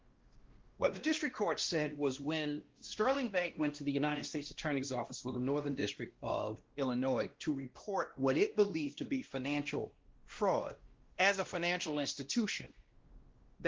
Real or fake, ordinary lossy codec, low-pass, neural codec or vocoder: fake; Opus, 16 kbps; 7.2 kHz; codec, 16 kHz, 1 kbps, X-Codec, WavLM features, trained on Multilingual LibriSpeech